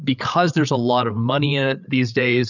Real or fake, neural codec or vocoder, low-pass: fake; codec, 16 kHz, 8 kbps, FreqCodec, larger model; 7.2 kHz